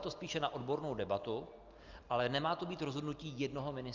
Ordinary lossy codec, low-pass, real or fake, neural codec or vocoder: Opus, 32 kbps; 7.2 kHz; real; none